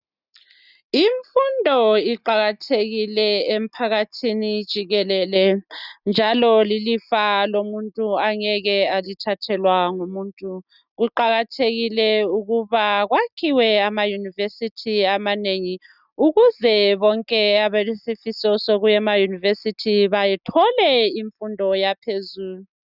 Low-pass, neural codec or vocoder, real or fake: 5.4 kHz; none; real